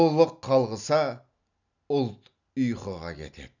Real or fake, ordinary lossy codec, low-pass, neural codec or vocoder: real; none; 7.2 kHz; none